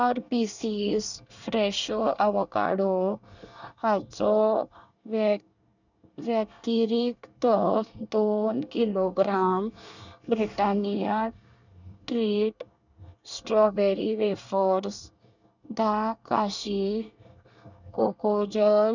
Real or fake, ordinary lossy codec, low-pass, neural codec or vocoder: fake; none; 7.2 kHz; codec, 24 kHz, 1 kbps, SNAC